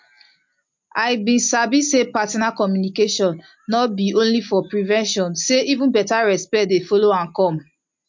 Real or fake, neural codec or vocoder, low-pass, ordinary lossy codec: real; none; 7.2 kHz; MP3, 48 kbps